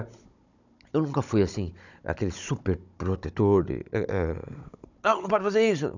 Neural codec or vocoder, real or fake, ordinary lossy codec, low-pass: codec, 16 kHz, 16 kbps, FunCodec, trained on Chinese and English, 50 frames a second; fake; none; 7.2 kHz